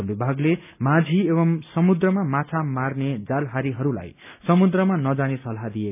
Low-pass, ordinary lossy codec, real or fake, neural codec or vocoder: 3.6 kHz; none; real; none